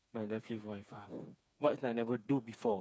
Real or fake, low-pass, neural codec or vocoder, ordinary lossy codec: fake; none; codec, 16 kHz, 4 kbps, FreqCodec, smaller model; none